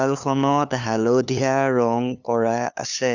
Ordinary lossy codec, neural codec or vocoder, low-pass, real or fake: none; codec, 16 kHz, 2 kbps, FunCodec, trained on LibriTTS, 25 frames a second; 7.2 kHz; fake